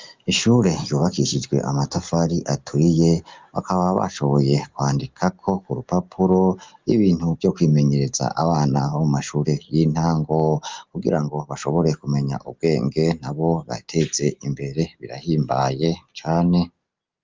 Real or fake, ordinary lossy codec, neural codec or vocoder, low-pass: real; Opus, 32 kbps; none; 7.2 kHz